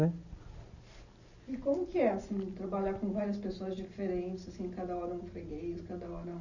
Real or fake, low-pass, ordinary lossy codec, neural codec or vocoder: real; 7.2 kHz; none; none